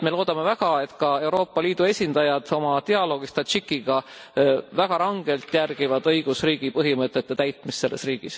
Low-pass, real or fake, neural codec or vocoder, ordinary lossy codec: none; real; none; none